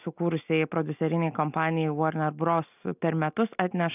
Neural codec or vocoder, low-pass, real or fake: none; 3.6 kHz; real